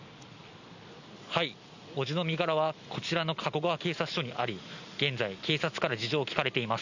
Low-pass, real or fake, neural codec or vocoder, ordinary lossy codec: 7.2 kHz; real; none; none